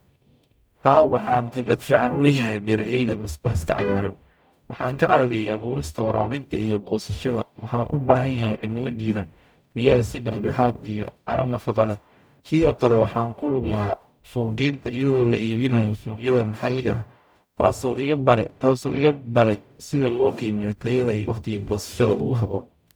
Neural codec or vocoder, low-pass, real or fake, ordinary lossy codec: codec, 44.1 kHz, 0.9 kbps, DAC; none; fake; none